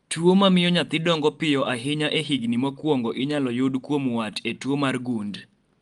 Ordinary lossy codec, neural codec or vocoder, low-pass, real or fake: Opus, 32 kbps; none; 10.8 kHz; real